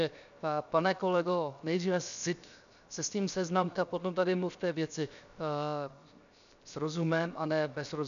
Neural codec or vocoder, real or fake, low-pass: codec, 16 kHz, 0.7 kbps, FocalCodec; fake; 7.2 kHz